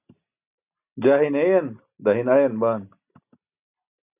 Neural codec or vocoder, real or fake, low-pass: none; real; 3.6 kHz